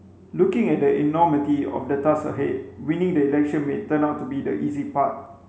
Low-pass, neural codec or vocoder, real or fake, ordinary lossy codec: none; none; real; none